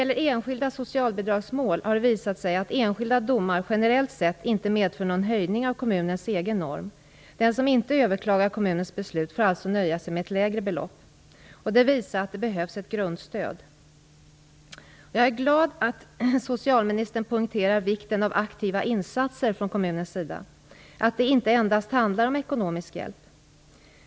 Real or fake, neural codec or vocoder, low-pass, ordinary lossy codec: real; none; none; none